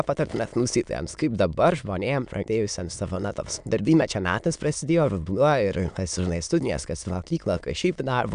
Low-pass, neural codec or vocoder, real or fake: 9.9 kHz; autoencoder, 22.05 kHz, a latent of 192 numbers a frame, VITS, trained on many speakers; fake